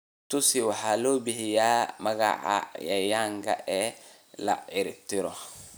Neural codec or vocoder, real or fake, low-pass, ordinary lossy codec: none; real; none; none